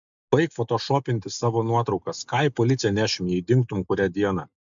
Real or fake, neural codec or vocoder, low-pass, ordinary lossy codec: real; none; 7.2 kHz; MP3, 64 kbps